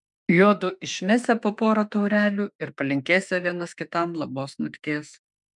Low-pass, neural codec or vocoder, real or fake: 10.8 kHz; autoencoder, 48 kHz, 32 numbers a frame, DAC-VAE, trained on Japanese speech; fake